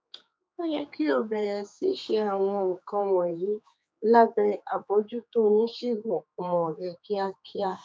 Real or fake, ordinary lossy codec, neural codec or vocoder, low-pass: fake; none; codec, 16 kHz, 4 kbps, X-Codec, HuBERT features, trained on general audio; none